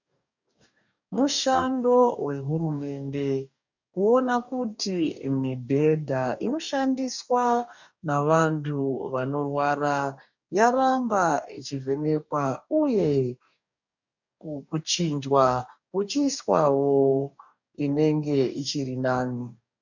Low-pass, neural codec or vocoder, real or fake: 7.2 kHz; codec, 44.1 kHz, 2.6 kbps, DAC; fake